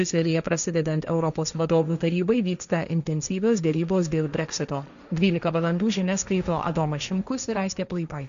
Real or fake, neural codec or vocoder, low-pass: fake; codec, 16 kHz, 1.1 kbps, Voila-Tokenizer; 7.2 kHz